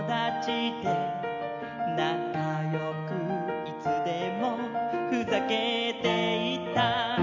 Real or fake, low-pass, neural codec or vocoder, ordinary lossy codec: real; 7.2 kHz; none; none